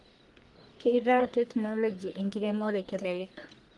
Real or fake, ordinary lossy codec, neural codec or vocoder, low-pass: fake; Opus, 24 kbps; codec, 44.1 kHz, 1.7 kbps, Pupu-Codec; 10.8 kHz